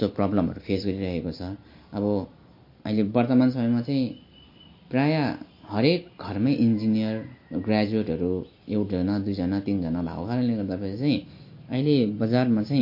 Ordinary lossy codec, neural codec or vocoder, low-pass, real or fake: MP3, 32 kbps; none; 5.4 kHz; real